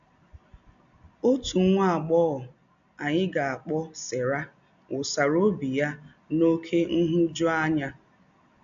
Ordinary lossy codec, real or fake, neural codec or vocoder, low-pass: AAC, 96 kbps; real; none; 7.2 kHz